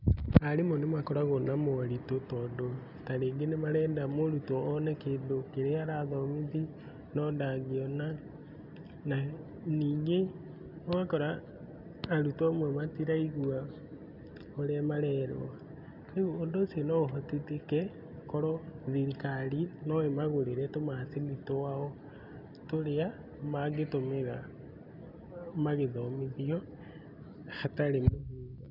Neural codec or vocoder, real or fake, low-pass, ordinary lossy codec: none; real; 5.4 kHz; none